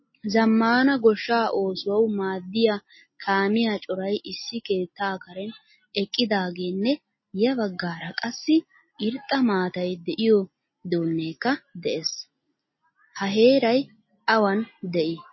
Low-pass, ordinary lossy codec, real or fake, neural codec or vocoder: 7.2 kHz; MP3, 24 kbps; real; none